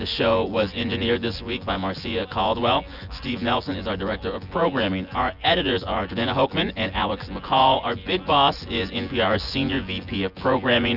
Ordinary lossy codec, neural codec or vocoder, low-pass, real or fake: Opus, 64 kbps; vocoder, 24 kHz, 100 mel bands, Vocos; 5.4 kHz; fake